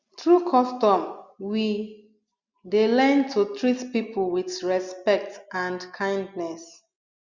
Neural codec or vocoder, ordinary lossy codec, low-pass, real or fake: none; Opus, 64 kbps; 7.2 kHz; real